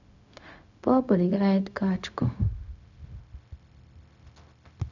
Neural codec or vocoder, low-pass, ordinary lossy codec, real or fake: codec, 16 kHz, 0.4 kbps, LongCat-Audio-Codec; 7.2 kHz; none; fake